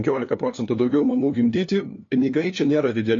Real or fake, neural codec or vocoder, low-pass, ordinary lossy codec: fake; codec, 16 kHz, 2 kbps, FunCodec, trained on LibriTTS, 25 frames a second; 7.2 kHz; AAC, 32 kbps